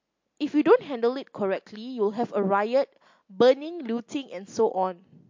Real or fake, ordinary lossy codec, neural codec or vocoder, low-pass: real; MP3, 48 kbps; none; 7.2 kHz